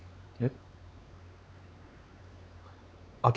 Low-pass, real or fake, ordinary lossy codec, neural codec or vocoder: none; fake; none; codec, 16 kHz, 4 kbps, X-Codec, WavLM features, trained on Multilingual LibriSpeech